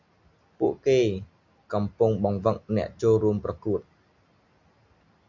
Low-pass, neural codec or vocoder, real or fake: 7.2 kHz; none; real